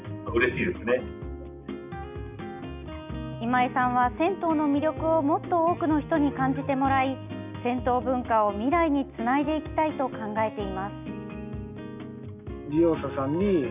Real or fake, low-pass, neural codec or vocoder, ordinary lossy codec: real; 3.6 kHz; none; none